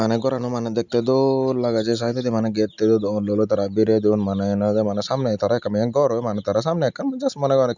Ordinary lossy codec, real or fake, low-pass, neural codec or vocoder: none; real; 7.2 kHz; none